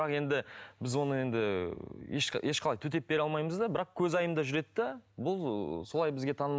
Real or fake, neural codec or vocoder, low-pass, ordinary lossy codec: real; none; none; none